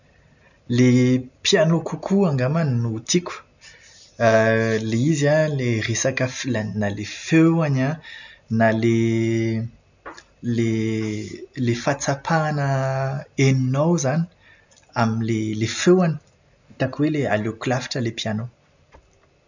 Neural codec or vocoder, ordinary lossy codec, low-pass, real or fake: none; none; 7.2 kHz; real